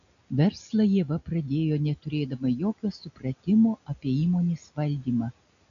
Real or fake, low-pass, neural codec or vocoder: real; 7.2 kHz; none